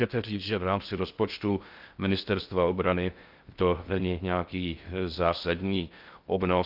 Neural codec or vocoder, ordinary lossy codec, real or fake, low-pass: codec, 16 kHz in and 24 kHz out, 0.6 kbps, FocalCodec, streaming, 2048 codes; Opus, 24 kbps; fake; 5.4 kHz